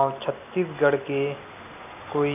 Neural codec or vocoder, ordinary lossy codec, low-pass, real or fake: none; none; 3.6 kHz; real